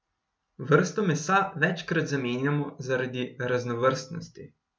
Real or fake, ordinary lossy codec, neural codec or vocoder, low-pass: real; none; none; none